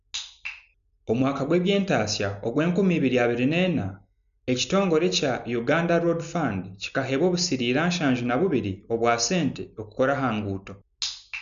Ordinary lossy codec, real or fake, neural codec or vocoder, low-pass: none; real; none; 7.2 kHz